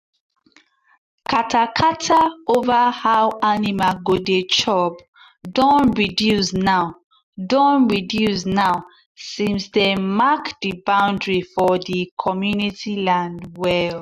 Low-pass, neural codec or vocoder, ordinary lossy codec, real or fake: 14.4 kHz; none; MP3, 96 kbps; real